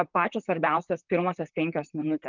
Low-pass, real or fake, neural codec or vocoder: 7.2 kHz; fake; vocoder, 44.1 kHz, 128 mel bands, Pupu-Vocoder